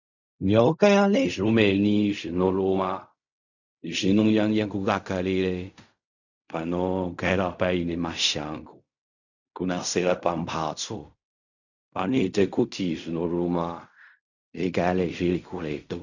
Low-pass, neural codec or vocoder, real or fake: 7.2 kHz; codec, 16 kHz in and 24 kHz out, 0.4 kbps, LongCat-Audio-Codec, fine tuned four codebook decoder; fake